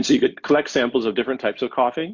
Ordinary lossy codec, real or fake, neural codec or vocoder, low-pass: MP3, 48 kbps; real; none; 7.2 kHz